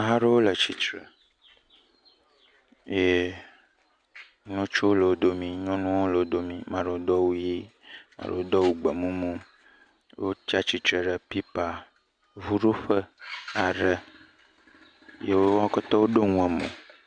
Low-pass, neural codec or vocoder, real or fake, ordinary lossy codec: 9.9 kHz; none; real; Opus, 64 kbps